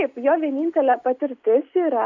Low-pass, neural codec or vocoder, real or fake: 7.2 kHz; none; real